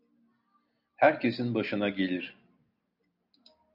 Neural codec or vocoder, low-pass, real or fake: none; 5.4 kHz; real